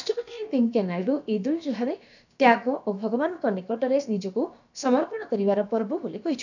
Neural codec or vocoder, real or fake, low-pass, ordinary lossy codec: codec, 16 kHz, about 1 kbps, DyCAST, with the encoder's durations; fake; 7.2 kHz; none